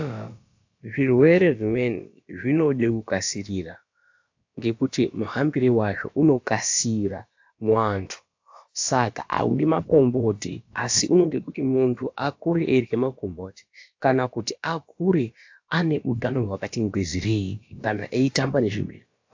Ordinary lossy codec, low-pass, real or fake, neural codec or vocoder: AAC, 48 kbps; 7.2 kHz; fake; codec, 16 kHz, about 1 kbps, DyCAST, with the encoder's durations